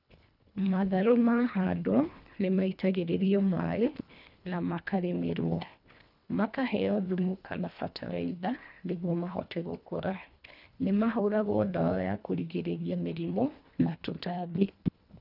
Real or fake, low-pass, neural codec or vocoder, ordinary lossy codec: fake; 5.4 kHz; codec, 24 kHz, 1.5 kbps, HILCodec; none